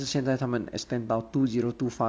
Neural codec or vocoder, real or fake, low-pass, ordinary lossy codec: none; real; none; none